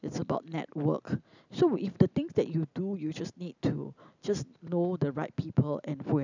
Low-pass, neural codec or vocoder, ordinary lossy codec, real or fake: 7.2 kHz; none; none; real